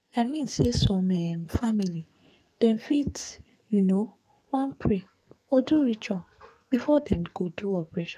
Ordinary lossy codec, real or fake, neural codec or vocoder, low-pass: none; fake; codec, 44.1 kHz, 2.6 kbps, SNAC; 14.4 kHz